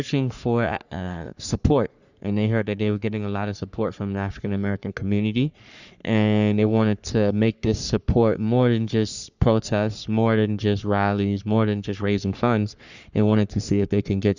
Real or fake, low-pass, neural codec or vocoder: fake; 7.2 kHz; codec, 44.1 kHz, 3.4 kbps, Pupu-Codec